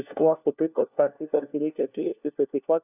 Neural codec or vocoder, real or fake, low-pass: codec, 16 kHz, 1 kbps, FunCodec, trained on LibriTTS, 50 frames a second; fake; 3.6 kHz